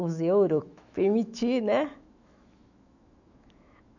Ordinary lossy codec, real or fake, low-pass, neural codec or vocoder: none; fake; 7.2 kHz; autoencoder, 48 kHz, 128 numbers a frame, DAC-VAE, trained on Japanese speech